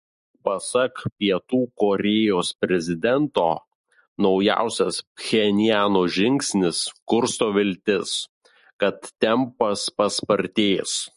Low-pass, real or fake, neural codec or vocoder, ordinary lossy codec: 10.8 kHz; real; none; MP3, 48 kbps